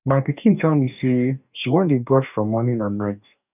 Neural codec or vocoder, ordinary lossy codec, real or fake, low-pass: codec, 44.1 kHz, 2.6 kbps, DAC; none; fake; 3.6 kHz